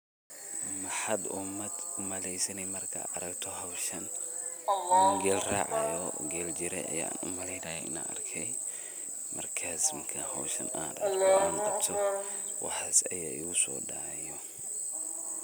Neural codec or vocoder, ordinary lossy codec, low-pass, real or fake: none; none; none; real